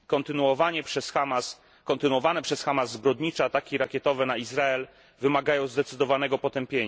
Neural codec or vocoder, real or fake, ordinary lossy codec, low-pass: none; real; none; none